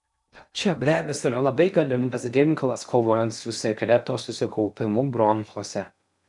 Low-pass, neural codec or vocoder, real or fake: 10.8 kHz; codec, 16 kHz in and 24 kHz out, 0.6 kbps, FocalCodec, streaming, 2048 codes; fake